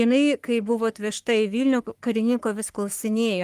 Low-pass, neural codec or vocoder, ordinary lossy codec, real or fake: 14.4 kHz; codec, 44.1 kHz, 3.4 kbps, Pupu-Codec; Opus, 32 kbps; fake